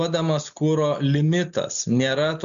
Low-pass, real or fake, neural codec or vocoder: 7.2 kHz; real; none